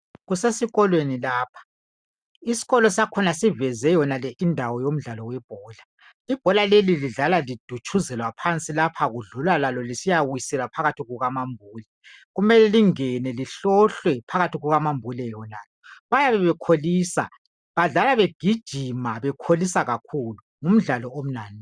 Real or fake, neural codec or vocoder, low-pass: real; none; 9.9 kHz